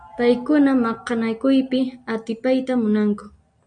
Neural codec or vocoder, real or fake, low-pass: none; real; 9.9 kHz